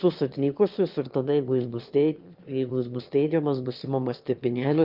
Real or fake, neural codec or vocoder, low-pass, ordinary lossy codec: fake; autoencoder, 22.05 kHz, a latent of 192 numbers a frame, VITS, trained on one speaker; 5.4 kHz; Opus, 24 kbps